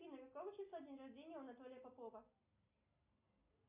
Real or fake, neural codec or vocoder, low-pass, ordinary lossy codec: real; none; 3.6 kHz; MP3, 32 kbps